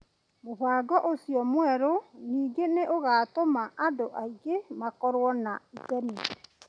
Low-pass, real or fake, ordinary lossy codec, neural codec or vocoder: 9.9 kHz; real; none; none